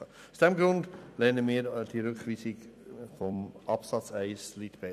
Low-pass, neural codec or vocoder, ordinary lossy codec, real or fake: 14.4 kHz; vocoder, 44.1 kHz, 128 mel bands every 256 samples, BigVGAN v2; none; fake